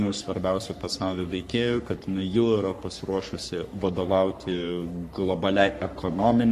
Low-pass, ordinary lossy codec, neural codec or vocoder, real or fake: 14.4 kHz; MP3, 64 kbps; codec, 44.1 kHz, 3.4 kbps, Pupu-Codec; fake